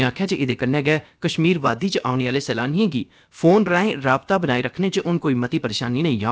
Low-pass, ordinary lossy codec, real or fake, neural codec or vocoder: none; none; fake; codec, 16 kHz, about 1 kbps, DyCAST, with the encoder's durations